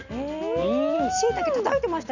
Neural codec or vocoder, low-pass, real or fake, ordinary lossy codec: none; 7.2 kHz; real; none